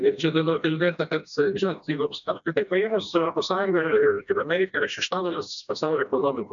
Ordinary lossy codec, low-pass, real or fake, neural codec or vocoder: MP3, 96 kbps; 7.2 kHz; fake; codec, 16 kHz, 1 kbps, FreqCodec, smaller model